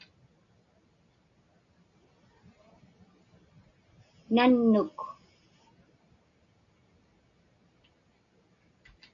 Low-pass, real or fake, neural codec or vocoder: 7.2 kHz; real; none